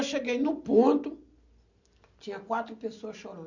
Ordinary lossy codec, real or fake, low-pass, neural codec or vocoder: none; real; 7.2 kHz; none